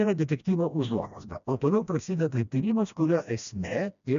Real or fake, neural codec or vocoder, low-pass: fake; codec, 16 kHz, 1 kbps, FreqCodec, smaller model; 7.2 kHz